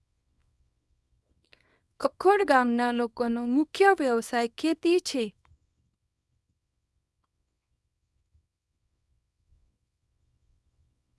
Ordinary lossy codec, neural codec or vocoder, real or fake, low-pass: none; codec, 24 kHz, 0.9 kbps, WavTokenizer, small release; fake; none